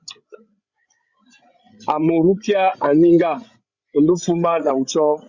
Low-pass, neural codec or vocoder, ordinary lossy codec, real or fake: 7.2 kHz; codec, 16 kHz, 8 kbps, FreqCodec, larger model; Opus, 64 kbps; fake